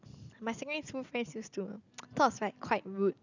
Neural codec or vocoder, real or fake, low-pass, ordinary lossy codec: none; real; 7.2 kHz; none